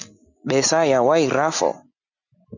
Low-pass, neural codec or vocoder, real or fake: 7.2 kHz; none; real